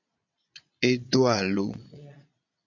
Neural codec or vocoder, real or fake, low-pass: vocoder, 22.05 kHz, 80 mel bands, Vocos; fake; 7.2 kHz